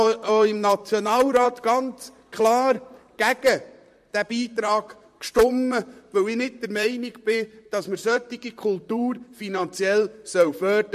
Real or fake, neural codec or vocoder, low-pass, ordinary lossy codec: fake; vocoder, 44.1 kHz, 128 mel bands, Pupu-Vocoder; 14.4 kHz; MP3, 64 kbps